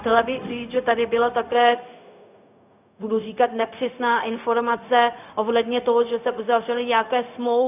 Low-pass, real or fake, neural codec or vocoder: 3.6 kHz; fake; codec, 16 kHz, 0.4 kbps, LongCat-Audio-Codec